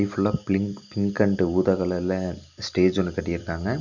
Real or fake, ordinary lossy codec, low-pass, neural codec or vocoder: real; none; 7.2 kHz; none